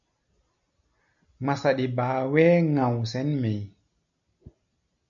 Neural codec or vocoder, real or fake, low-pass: none; real; 7.2 kHz